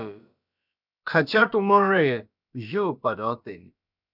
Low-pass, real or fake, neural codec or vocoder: 5.4 kHz; fake; codec, 16 kHz, about 1 kbps, DyCAST, with the encoder's durations